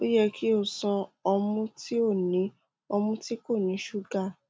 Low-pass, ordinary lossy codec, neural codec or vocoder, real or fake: none; none; none; real